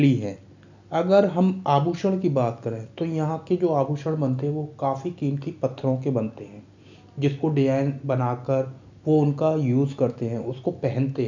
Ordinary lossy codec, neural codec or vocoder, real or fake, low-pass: none; none; real; 7.2 kHz